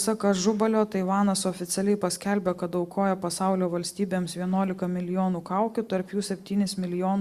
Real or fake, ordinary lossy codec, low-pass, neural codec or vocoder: real; Opus, 64 kbps; 14.4 kHz; none